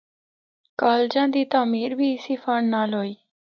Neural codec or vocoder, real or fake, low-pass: none; real; 7.2 kHz